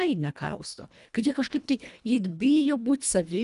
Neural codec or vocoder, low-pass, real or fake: codec, 24 kHz, 1.5 kbps, HILCodec; 10.8 kHz; fake